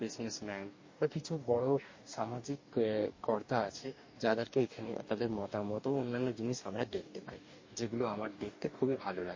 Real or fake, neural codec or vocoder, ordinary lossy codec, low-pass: fake; codec, 44.1 kHz, 2.6 kbps, DAC; MP3, 32 kbps; 7.2 kHz